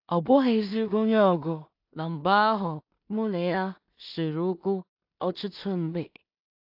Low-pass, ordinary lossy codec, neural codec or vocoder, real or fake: 5.4 kHz; AAC, 48 kbps; codec, 16 kHz in and 24 kHz out, 0.4 kbps, LongCat-Audio-Codec, two codebook decoder; fake